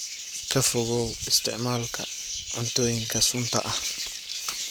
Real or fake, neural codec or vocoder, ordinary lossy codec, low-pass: fake; codec, 44.1 kHz, 7.8 kbps, Pupu-Codec; none; none